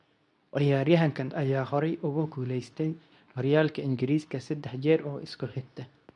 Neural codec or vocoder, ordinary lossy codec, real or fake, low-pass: codec, 24 kHz, 0.9 kbps, WavTokenizer, medium speech release version 2; none; fake; 10.8 kHz